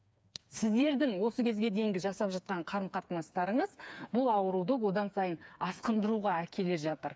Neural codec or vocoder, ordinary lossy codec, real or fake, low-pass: codec, 16 kHz, 4 kbps, FreqCodec, smaller model; none; fake; none